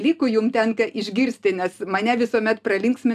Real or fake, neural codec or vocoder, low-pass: real; none; 14.4 kHz